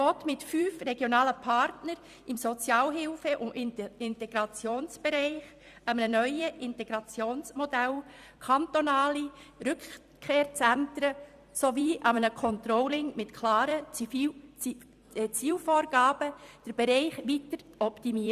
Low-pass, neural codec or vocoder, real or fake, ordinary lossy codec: 14.4 kHz; vocoder, 44.1 kHz, 128 mel bands every 256 samples, BigVGAN v2; fake; Opus, 64 kbps